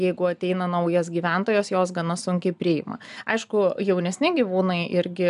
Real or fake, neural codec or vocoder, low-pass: real; none; 10.8 kHz